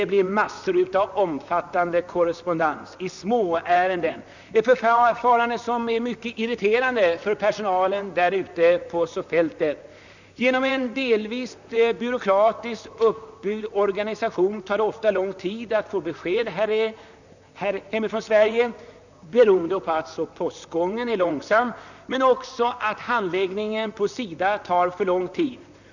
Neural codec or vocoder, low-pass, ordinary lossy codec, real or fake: vocoder, 44.1 kHz, 128 mel bands, Pupu-Vocoder; 7.2 kHz; none; fake